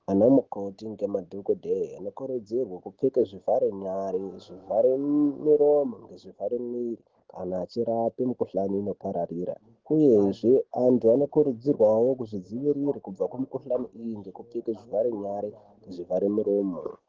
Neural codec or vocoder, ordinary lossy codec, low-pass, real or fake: none; Opus, 16 kbps; 7.2 kHz; real